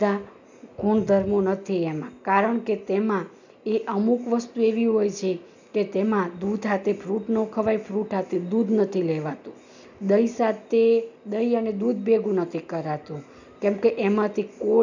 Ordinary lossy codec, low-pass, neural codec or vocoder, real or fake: none; 7.2 kHz; none; real